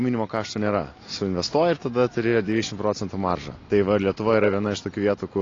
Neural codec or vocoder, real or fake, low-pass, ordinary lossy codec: none; real; 7.2 kHz; AAC, 32 kbps